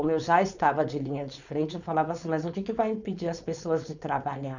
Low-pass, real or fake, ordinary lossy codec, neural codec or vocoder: 7.2 kHz; fake; none; codec, 16 kHz, 4.8 kbps, FACodec